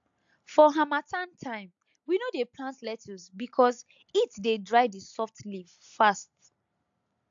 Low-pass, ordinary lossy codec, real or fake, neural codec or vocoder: 7.2 kHz; AAC, 64 kbps; real; none